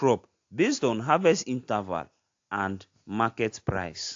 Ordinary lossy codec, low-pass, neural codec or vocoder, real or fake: AAC, 48 kbps; 7.2 kHz; none; real